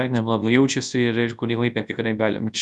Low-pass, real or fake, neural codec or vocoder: 10.8 kHz; fake; codec, 24 kHz, 0.9 kbps, WavTokenizer, large speech release